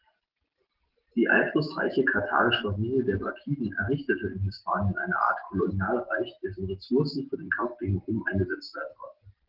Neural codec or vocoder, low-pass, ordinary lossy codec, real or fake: none; 5.4 kHz; Opus, 16 kbps; real